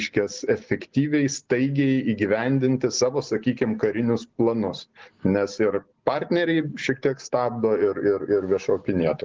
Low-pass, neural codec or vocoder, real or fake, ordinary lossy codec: 7.2 kHz; none; real; Opus, 16 kbps